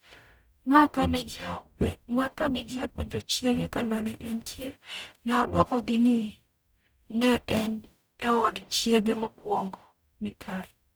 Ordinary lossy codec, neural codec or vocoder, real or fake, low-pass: none; codec, 44.1 kHz, 0.9 kbps, DAC; fake; none